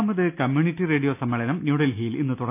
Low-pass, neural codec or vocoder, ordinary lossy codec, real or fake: 3.6 kHz; none; none; real